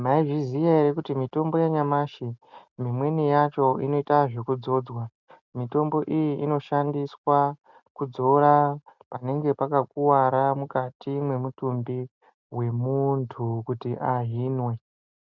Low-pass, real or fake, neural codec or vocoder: 7.2 kHz; real; none